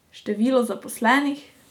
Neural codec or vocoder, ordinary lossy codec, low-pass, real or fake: none; none; 19.8 kHz; real